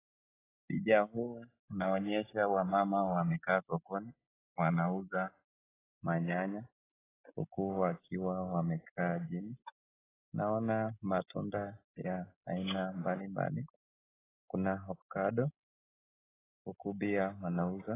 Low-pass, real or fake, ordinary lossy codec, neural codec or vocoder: 3.6 kHz; real; AAC, 16 kbps; none